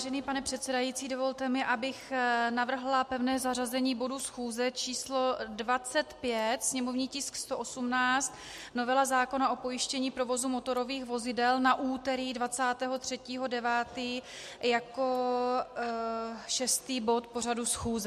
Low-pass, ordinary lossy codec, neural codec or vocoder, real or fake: 14.4 kHz; MP3, 64 kbps; none; real